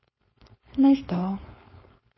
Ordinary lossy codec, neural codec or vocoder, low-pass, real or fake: MP3, 24 kbps; codec, 16 kHz, 4.8 kbps, FACodec; 7.2 kHz; fake